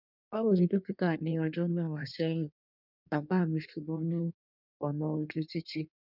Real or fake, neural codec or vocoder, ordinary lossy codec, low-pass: fake; codec, 16 kHz in and 24 kHz out, 1.1 kbps, FireRedTTS-2 codec; none; 5.4 kHz